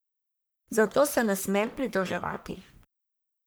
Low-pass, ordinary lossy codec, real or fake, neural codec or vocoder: none; none; fake; codec, 44.1 kHz, 1.7 kbps, Pupu-Codec